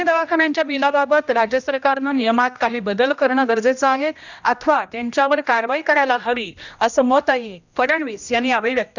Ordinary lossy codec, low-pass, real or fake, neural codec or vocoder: none; 7.2 kHz; fake; codec, 16 kHz, 1 kbps, X-Codec, HuBERT features, trained on general audio